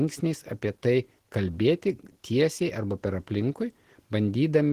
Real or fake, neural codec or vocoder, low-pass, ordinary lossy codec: real; none; 14.4 kHz; Opus, 16 kbps